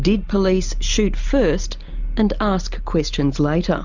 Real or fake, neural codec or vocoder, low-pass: real; none; 7.2 kHz